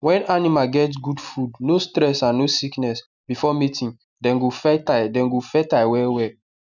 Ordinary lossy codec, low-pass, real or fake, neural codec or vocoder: none; 7.2 kHz; real; none